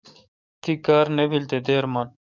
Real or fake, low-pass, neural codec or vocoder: fake; 7.2 kHz; codec, 44.1 kHz, 7.8 kbps, DAC